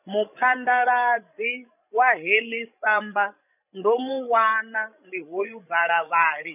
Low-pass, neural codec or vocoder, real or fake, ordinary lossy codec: 3.6 kHz; codec, 16 kHz, 16 kbps, FreqCodec, larger model; fake; none